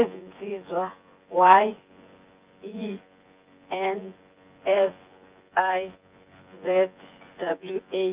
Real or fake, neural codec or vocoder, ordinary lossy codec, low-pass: fake; vocoder, 24 kHz, 100 mel bands, Vocos; Opus, 24 kbps; 3.6 kHz